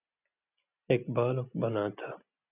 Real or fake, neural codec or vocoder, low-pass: real; none; 3.6 kHz